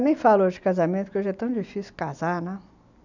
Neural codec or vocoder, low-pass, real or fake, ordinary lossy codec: none; 7.2 kHz; real; none